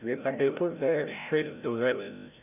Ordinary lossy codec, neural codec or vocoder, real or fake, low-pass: none; codec, 16 kHz, 0.5 kbps, FreqCodec, larger model; fake; 3.6 kHz